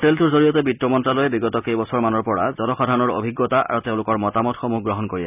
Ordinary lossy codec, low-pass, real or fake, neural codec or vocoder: none; 3.6 kHz; real; none